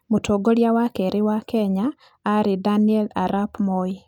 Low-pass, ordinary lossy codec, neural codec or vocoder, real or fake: 19.8 kHz; none; none; real